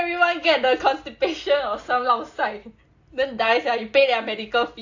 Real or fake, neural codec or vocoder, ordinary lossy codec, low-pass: real; none; AAC, 48 kbps; 7.2 kHz